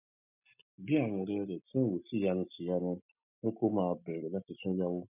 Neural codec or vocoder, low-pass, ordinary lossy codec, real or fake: none; 3.6 kHz; none; real